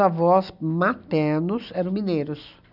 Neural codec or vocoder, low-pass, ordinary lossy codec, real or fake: none; 5.4 kHz; none; real